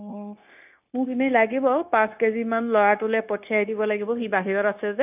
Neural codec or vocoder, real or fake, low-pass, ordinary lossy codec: codec, 16 kHz, 0.9 kbps, LongCat-Audio-Codec; fake; 3.6 kHz; none